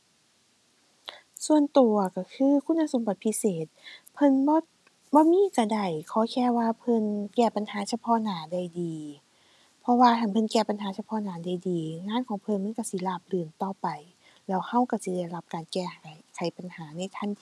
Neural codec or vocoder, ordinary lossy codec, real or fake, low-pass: none; none; real; none